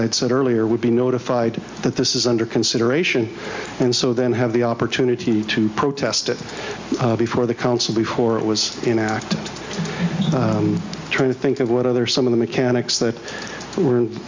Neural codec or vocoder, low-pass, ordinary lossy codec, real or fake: none; 7.2 kHz; MP3, 64 kbps; real